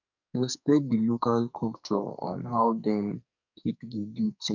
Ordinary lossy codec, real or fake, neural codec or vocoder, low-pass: none; fake; codec, 44.1 kHz, 2.6 kbps, SNAC; 7.2 kHz